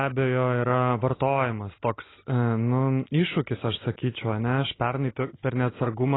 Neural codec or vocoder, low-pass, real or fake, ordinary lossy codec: none; 7.2 kHz; real; AAC, 16 kbps